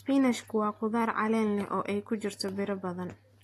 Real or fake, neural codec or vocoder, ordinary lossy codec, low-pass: real; none; AAC, 48 kbps; 14.4 kHz